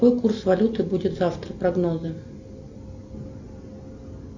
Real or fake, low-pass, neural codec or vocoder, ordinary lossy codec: real; 7.2 kHz; none; AAC, 48 kbps